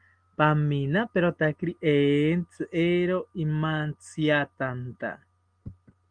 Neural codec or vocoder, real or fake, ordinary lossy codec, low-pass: none; real; Opus, 24 kbps; 9.9 kHz